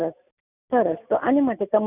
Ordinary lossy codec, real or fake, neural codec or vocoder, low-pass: none; real; none; 3.6 kHz